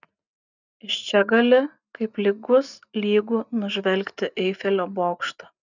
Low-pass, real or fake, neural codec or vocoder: 7.2 kHz; real; none